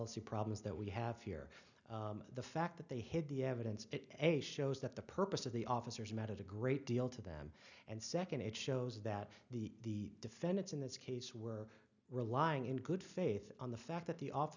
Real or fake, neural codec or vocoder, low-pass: real; none; 7.2 kHz